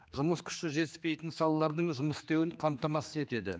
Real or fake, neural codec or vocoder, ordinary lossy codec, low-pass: fake; codec, 16 kHz, 2 kbps, X-Codec, HuBERT features, trained on general audio; none; none